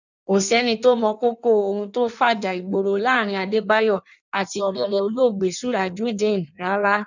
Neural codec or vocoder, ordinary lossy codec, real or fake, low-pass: codec, 16 kHz in and 24 kHz out, 1.1 kbps, FireRedTTS-2 codec; none; fake; 7.2 kHz